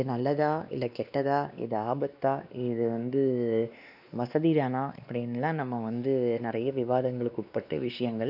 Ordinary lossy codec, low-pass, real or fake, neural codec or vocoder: none; 5.4 kHz; fake; codec, 16 kHz, 2 kbps, X-Codec, WavLM features, trained on Multilingual LibriSpeech